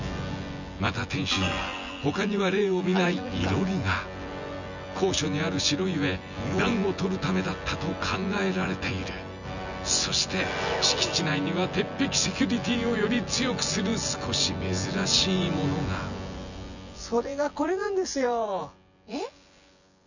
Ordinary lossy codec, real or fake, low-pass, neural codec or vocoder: none; fake; 7.2 kHz; vocoder, 24 kHz, 100 mel bands, Vocos